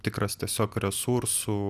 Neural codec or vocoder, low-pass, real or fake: none; 14.4 kHz; real